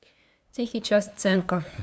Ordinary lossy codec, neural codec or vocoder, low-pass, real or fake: none; codec, 16 kHz, 2 kbps, FunCodec, trained on LibriTTS, 25 frames a second; none; fake